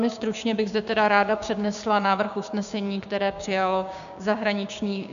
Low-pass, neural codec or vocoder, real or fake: 7.2 kHz; codec, 16 kHz, 6 kbps, DAC; fake